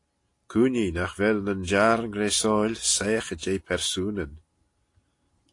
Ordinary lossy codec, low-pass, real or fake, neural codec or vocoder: AAC, 48 kbps; 10.8 kHz; real; none